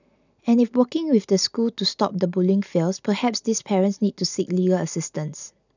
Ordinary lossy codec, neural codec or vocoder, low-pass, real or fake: none; none; 7.2 kHz; real